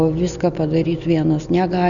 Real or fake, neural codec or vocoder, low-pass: real; none; 7.2 kHz